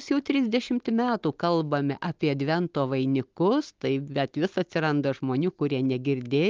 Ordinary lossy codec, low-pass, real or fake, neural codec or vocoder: Opus, 32 kbps; 7.2 kHz; real; none